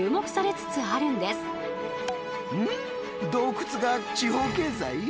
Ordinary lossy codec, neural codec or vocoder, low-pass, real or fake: none; none; none; real